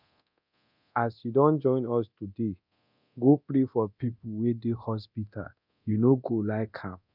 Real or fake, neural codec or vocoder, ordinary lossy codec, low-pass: fake; codec, 24 kHz, 0.9 kbps, DualCodec; none; 5.4 kHz